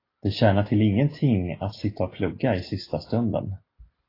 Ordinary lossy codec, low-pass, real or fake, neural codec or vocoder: AAC, 24 kbps; 5.4 kHz; real; none